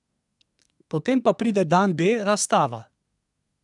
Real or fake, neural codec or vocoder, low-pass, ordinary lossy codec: fake; codec, 24 kHz, 1 kbps, SNAC; 10.8 kHz; none